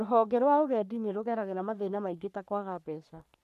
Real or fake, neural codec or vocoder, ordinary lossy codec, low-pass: fake; codec, 44.1 kHz, 3.4 kbps, Pupu-Codec; none; 14.4 kHz